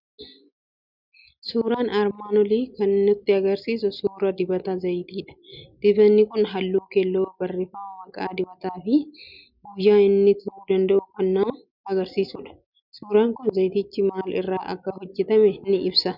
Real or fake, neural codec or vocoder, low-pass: real; none; 5.4 kHz